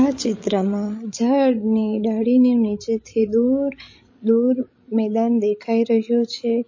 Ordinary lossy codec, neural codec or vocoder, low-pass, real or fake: MP3, 32 kbps; codec, 16 kHz, 16 kbps, FreqCodec, larger model; 7.2 kHz; fake